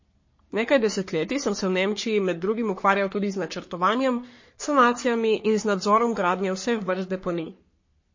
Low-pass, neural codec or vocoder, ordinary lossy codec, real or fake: 7.2 kHz; codec, 44.1 kHz, 3.4 kbps, Pupu-Codec; MP3, 32 kbps; fake